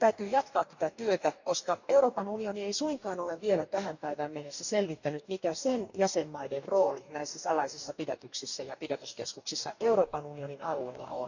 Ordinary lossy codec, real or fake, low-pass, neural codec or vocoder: none; fake; 7.2 kHz; codec, 44.1 kHz, 2.6 kbps, DAC